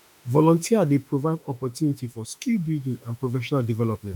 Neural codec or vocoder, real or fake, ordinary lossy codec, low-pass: autoencoder, 48 kHz, 32 numbers a frame, DAC-VAE, trained on Japanese speech; fake; none; 19.8 kHz